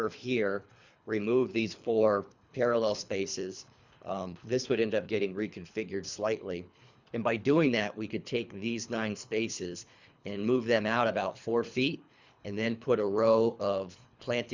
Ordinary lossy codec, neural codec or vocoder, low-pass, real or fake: Opus, 64 kbps; codec, 24 kHz, 3 kbps, HILCodec; 7.2 kHz; fake